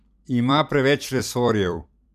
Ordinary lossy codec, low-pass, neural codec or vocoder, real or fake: none; 14.4 kHz; vocoder, 44.1 kHz, 128 mel bands every 256 samples, BigVGAN v2; fake